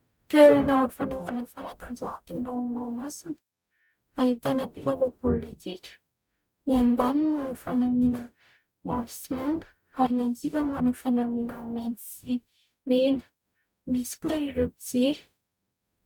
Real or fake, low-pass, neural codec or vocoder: fake; 19.8 kHz; codec, 44.1 kHz, 0.9 kbps, DAC